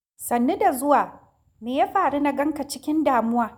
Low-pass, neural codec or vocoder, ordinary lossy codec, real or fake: none; none; none; real